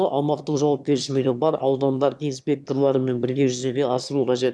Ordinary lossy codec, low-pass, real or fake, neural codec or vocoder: none; none; fake; autoencoder, 22.05 kHz, a latent of 192 numbers a frame, VITS, trained on one speaker